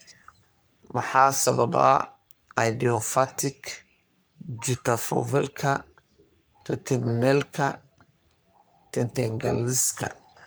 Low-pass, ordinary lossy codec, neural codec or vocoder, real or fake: none; none; codec, 44.1 kHz, 3.4 kbps, Pupu-Codec; fake